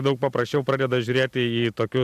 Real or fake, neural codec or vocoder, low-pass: fake; vocoder, 44.1 kHz, 128 mel bands every 256 samples, BigVGAN v2; 14.4 kHz